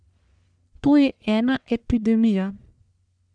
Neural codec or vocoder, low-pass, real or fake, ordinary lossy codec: codec, 44.1 kHz, 1.7 kbps, Pupu-Codec; 9.9 kHz; fake; none